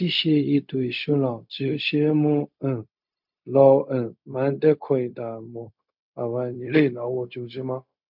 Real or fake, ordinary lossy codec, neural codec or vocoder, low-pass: fake; MP3, 48 kbps; codec, 16 kHz, 0.4 kbps, LongCat-Audio-Codec; 5.4 kHz